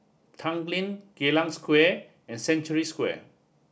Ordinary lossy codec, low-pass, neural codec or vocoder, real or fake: none; none; none; real